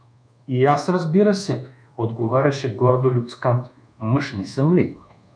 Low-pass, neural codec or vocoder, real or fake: 9.9 kHz; codec, 24 kHz, 1.2 kbps, DualCodec; fake